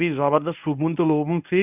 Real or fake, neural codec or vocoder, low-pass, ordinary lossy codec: fake; codec, 24 kHz, 0.9 kbps, WavTokenizer, medium speech release version 1; 3.6 kHz; none